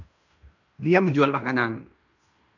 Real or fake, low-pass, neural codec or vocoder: fake; 7.2 kHz; codec, 16 kHz in and 24 kHz out, 0.9 kbps, LongCat-Audio-Codec, fine tuned four codebook decoder